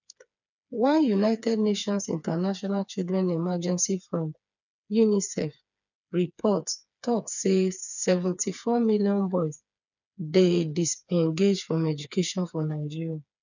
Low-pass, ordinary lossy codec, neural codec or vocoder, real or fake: 7.2 kHz; none; codec, 16 kHz, 4 kbps, FreqCodec, smaller model; fake